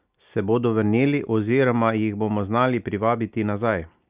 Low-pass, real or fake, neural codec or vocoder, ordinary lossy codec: 3.6 kHz; real; none; Opus, 64 kbps